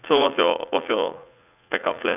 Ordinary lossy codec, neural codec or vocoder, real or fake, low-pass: none; vocoder, 44.1 kHz, 80 mel bands, Vocos; fake; 3.6 kHz